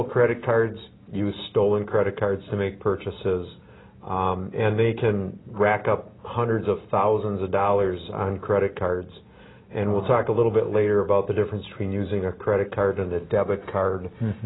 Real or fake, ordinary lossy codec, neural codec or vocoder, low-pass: real; AAC, 16 kbps; none; 7.2 kHz